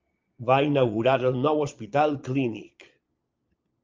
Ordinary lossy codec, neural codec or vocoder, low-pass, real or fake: Opus, 24 kbps; vocoder, 22.05 kHz, 80 mel bands, Vocos; 7.2 kHz; fake